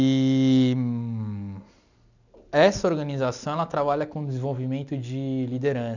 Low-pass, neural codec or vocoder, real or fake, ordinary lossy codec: 7.2 kHz; none; real; none